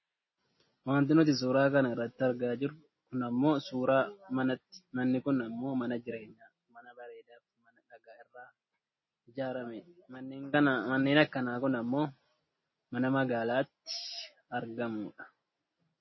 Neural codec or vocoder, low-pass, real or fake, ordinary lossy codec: none; 7.2 kHz; real; MP3, 24 kbps